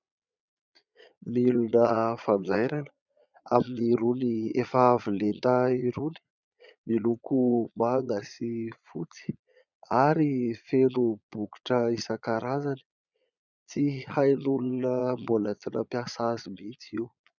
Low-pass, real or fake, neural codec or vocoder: 7.2 kHz; fake; vocoder, 22.05 kHz, 80 mel bands, Vocos